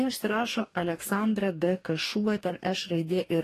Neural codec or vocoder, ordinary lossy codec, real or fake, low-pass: codec, 44.1 kHz, 2.6 kbps, DAC; AAC, 48 kbps; fake; 14.4 kHz